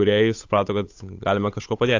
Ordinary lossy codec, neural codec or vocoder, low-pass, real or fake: AAC, 48 kbps; codec, 16 kHz, 8 kbps, FunCodec, trained on Chinese and English, 25 frames a second; 7.2 kHz; fake